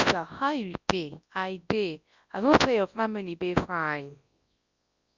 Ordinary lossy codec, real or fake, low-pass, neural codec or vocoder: Opus, 64 kbps; fake; 7.2 kHz; codec, 24 kHz, 0.9 kbps, WavTokenizer, large speech release